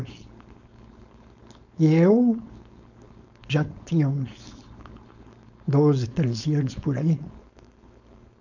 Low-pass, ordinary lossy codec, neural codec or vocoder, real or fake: 7.2 kHz; none; codec, 16 kHz, 4.8 kbps, FACodec; fake